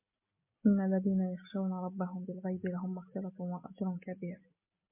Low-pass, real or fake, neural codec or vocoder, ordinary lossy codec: 3.6 kHz; real; none; MP3, 24 kbps